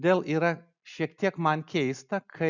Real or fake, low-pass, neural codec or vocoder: real; 7.2 kHz; none